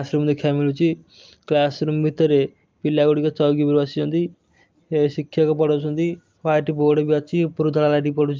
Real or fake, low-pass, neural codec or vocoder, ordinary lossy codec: real; 7.2 kHz; none; Opus, 24 kbps